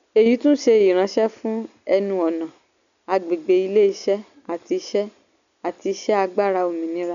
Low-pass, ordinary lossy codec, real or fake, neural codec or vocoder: 7.2 kHz; none; real; none